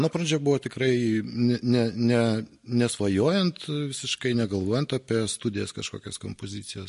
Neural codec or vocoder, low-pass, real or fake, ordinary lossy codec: none; 14.4 kHz; real; MP3, 48 kbps